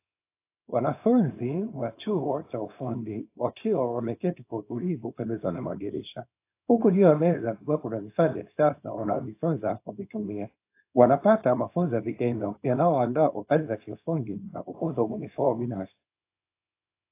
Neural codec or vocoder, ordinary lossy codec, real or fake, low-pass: codec, 24 kHz, 0.9 kbps, WavTokenizer, small release; AAC, 24 kbps; fake; 3.6 kHz